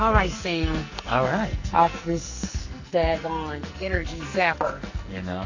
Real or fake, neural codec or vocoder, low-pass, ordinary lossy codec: fake; codec, 44.1 kHz, 2.6 kbps, SNAC; 7.2 kHz; AAC, 48 kbps